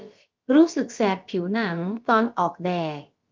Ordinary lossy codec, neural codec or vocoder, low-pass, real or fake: Opus, 24 kbps; codec, 16 kHz, about 1 kbps, DyCAST, with the encoder's durations; 7.2 kHz; fake